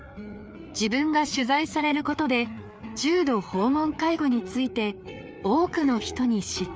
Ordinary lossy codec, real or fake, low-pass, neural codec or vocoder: none; fake; none; codec, 16 kHz, 4 kbps, FreqCodec, larger model